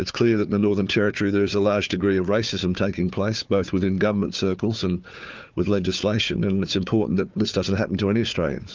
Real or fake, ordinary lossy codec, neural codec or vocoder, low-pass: fake; Opus, 24 kbps; codec, 16 kHz, 4 kbps, FunCodec, trained on Chinese and English, 50 frames a second; 7.2 kHz